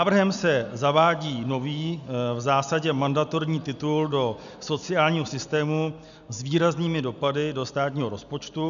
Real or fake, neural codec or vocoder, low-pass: real; none; 7.2 kHz